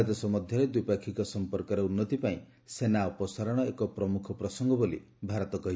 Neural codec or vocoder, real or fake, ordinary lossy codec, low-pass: none; real; none; none